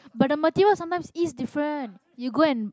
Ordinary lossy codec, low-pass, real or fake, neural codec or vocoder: none; none; real; none